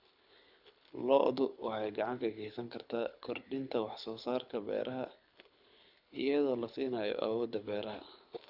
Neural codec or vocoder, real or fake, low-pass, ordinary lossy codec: codec, 24 kHz, 6 kbps, HILCodec; fake; 5.4 kHz; none